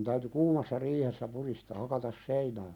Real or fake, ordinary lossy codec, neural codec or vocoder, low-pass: real; none; none; 19.8 kHz